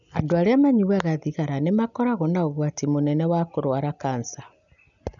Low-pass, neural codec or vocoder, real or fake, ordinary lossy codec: 7.2 kHz; none; real; none